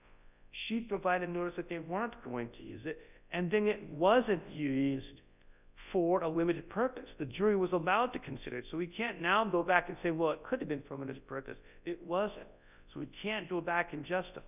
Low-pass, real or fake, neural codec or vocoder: 3.6 kHz; fake; codec, 24 kHz, 0.9 kbps, WavTokenizer, large speech release